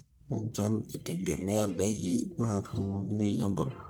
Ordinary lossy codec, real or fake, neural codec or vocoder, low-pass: none; fake; codec, 44.1 kHz, 1.7 kbps, Pupu-Codec; none